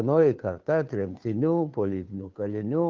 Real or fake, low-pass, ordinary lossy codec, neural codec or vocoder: fake; 7.2 kHz; Opus, 32 kbps; codec, 16 kHz, 2 kbps, FunCodec, trained on Chinese and English, 25 frames a second